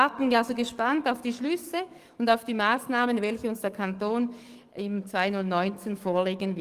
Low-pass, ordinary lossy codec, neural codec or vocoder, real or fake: 14.4 kHz; Opus, 24 kbps; codec, 44.1 kHz, 7.8 kbps, DAC; fake